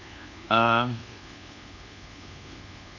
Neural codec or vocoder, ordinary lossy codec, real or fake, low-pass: codec, 24 kHz, 1.2 kbps, DualCodec; none; fake; 7.2 kHz